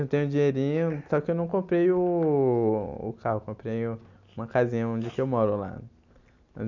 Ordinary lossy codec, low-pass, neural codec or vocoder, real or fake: none; 7.2 kHz; none; real